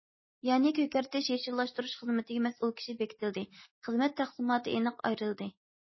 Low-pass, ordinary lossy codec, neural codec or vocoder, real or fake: 7.2 kHz; MP3, 24 kbps; none; real